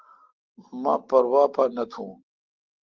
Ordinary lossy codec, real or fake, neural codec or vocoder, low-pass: Opus, 16 kbps; real; none; 7.2 kHz